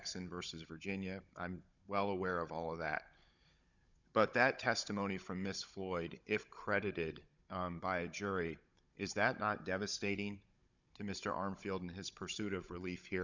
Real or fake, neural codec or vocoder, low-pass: fake; codec, 16 kHz, 16 kbps, FunCodec, trained on Chinese and English, 50 frames a second; 7.2 kHz